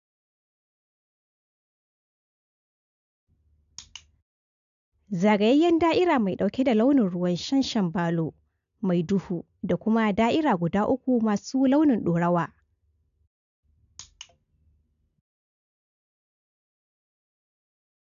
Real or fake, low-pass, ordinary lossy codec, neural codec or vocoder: real; 7.2 kHz; none; none